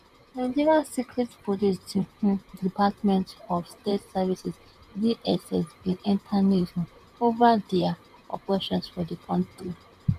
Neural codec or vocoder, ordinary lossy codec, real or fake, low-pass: vocoder, 44.1 kHz, 128 mel bands, Pupu-Vocoder; none; fake; 14.4 kHz